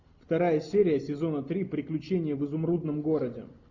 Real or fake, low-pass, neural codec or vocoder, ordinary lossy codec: real; 7.2 kHz; none; MP3, 64 kbps